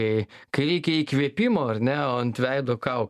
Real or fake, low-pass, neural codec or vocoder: real; 14.4 kHz; none